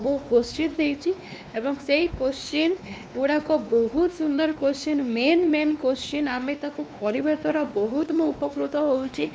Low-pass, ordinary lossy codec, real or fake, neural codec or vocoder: none; none; fake; codec, 16 kHz, 2 kbps, X-Codec, WavLM features, trained on Multilingual LibriSpeech